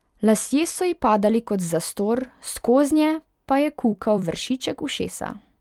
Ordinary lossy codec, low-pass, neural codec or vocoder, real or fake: Opus, 32 kbps; 19.8 kHz; vocoder, 44.1 kHz, 128 mel bands every 256 samples, BigVGAN v2; fake